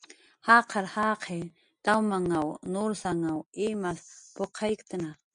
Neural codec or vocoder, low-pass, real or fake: none; 9.9 kHz; real